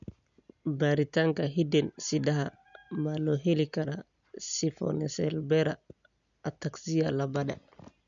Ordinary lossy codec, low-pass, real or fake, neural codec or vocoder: none; 7.2 kHz; real; none